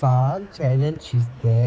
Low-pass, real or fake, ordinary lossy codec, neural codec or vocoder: none; fake; none; codec, 16 kHz, 4 kbps, X-Codec, HuBERT features, trained on general audio